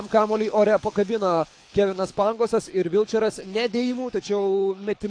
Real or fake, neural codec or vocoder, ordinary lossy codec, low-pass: fake; codec, 24 kHz, 6 kbps, HILCodec; AAC, 48 kbps; 9.9 kHz